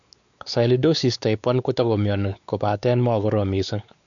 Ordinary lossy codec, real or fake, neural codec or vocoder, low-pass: none; fake; codec, 16 kHz, 4 kbps, X-Codec, WavLM features, trained on Multilingual LibriSpeech; 7.2 kHz